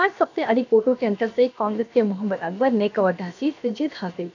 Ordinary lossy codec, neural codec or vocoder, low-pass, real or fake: none; codec, 16 kHz, about 1 kbps, DyCAST, with the encoder's durations; 7.2 kHz; fake